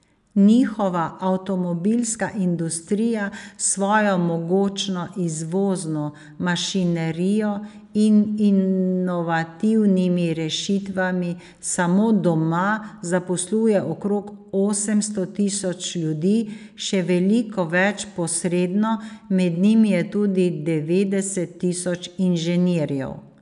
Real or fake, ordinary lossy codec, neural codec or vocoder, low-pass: real; none; none; 10.8 kHz